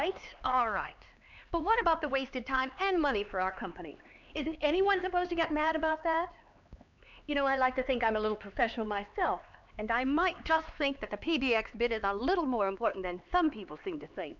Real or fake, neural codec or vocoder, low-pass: fake; codec, 16 kHz, 4 kbps, X-Codec, HuBERT features, trained on LibriSpeech; 7.2 kHz